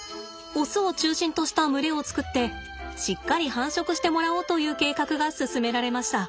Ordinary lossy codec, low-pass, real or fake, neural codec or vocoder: none; none; real; none